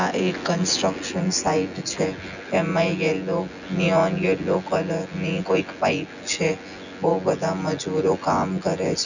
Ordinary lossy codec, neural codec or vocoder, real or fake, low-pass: none; vocoder, 24 kHz, 100 mel bands, Vocos; fake; 7.2 kHz